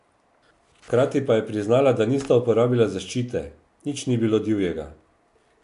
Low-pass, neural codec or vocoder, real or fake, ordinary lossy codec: 10.8 kHz; none; real; AAC, 64 kbps